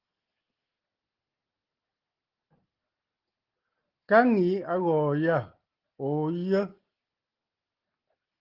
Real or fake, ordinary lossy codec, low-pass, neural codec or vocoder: real; Opus, 16 kbps; 5.4 kHz; none